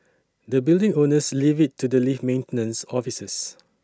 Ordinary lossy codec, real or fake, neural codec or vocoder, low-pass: none; real; none; none